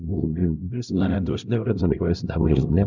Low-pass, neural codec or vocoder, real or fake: 7.2 kHz; codec, 16 kHz, 0.5 kbps, X-Codec, HuBERT features, trained on LibriSpeech; fake